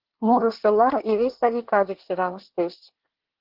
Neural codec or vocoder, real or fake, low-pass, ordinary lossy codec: codec, 24 kHz, 1 kbps, SNAC; fake; 5.4 kHz; Opus, 16 kbps